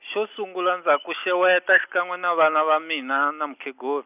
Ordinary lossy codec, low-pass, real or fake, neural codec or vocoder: none; 3.6 kHz; real; none